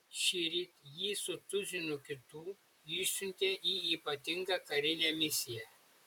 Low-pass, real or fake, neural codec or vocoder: 19.8 kHz; fake; vocoder, 44.1 kHz, 128 mel bands, Pupu-Vocoder